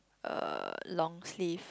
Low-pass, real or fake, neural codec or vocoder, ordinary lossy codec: none; real; none; none